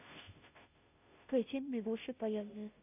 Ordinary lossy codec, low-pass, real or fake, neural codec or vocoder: none; 3.6 kHz; fake; codec, 16 kHz, 0.5 kbps, FunCodec, trained on Chinese and English, 25 frames a second